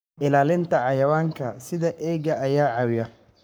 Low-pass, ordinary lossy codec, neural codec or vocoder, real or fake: none; none; codec, 44.1 kHz, 7.8 kbps, Pupu-Codec; fake